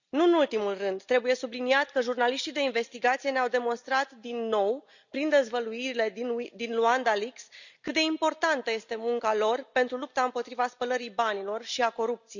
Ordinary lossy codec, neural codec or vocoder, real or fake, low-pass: none; none; real; 7.2 kHz